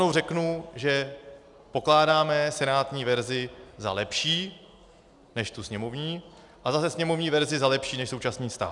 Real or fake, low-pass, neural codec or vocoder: real; 10.8 kHz; none